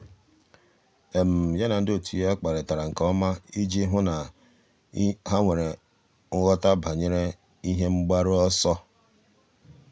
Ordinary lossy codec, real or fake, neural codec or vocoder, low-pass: none; real; none; none